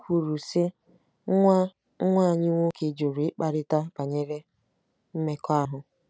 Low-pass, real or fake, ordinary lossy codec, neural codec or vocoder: none; real; none; none